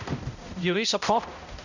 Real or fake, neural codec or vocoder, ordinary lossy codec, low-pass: fake; codec, 16 kHz, 0.5 kbps, X-Codec, HuBERT features, trained on balanced general audio; none; 7.2 kHz